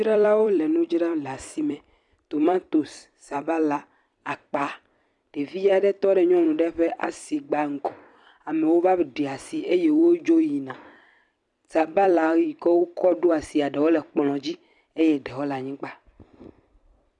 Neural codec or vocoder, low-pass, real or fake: vocoder, 44.1 kHz, 128 mel bands every 256 samples, BigVGAN v2; 10.8 kHz; fake